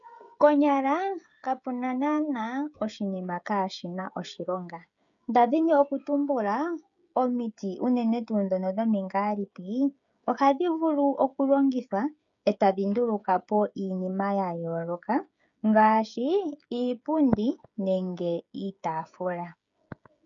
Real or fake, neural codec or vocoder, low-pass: fake; codec, 16 kHz, 16 kbps, FreqCodec, smaller model; 7.2 kHz